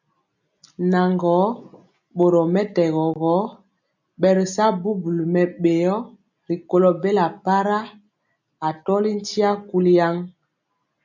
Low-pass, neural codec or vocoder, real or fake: 7.2 kHz; none; real